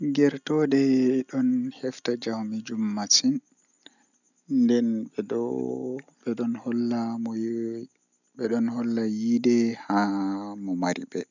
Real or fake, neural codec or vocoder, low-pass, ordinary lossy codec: real; none; 7.2 kHz; none